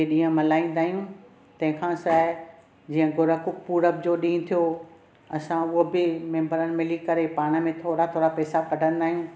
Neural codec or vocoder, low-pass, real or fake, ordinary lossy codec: none; none; real; none